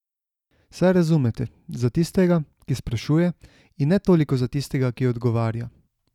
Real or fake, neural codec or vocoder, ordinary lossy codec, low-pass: real; none; none; 19.8 kHz